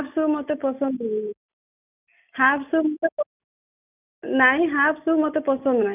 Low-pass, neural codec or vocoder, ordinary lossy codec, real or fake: 3.6 kHz; none; none; real